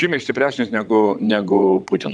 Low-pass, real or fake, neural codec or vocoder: 9.9 kHz; fake; vocoder, 22.05 kHz, 80 mel bands, WaveNeXt